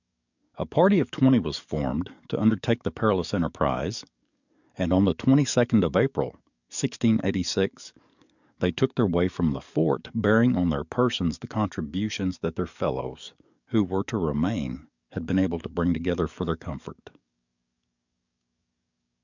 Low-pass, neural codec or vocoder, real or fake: 7.2 kHz; codec, 44.1 kHz, 7.8 kbps, DAC; fake